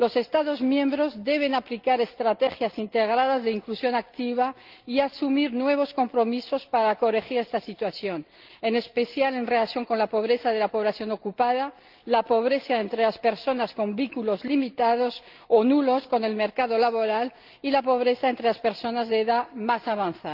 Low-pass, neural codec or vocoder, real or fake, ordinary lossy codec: 5.4 kHz; none; real; Opus, 32 kbps